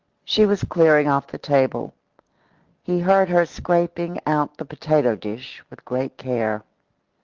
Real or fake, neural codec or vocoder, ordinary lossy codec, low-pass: real; none; Opus, 32 kbps; 7.2 kHz